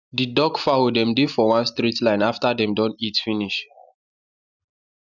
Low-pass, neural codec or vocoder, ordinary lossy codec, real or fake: 7.2 kHz; none; none; real